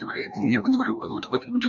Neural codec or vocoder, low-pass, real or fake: codec, 16 kHz, 1 kbps, FreqCodec, larger model; 7.2 kHz; fake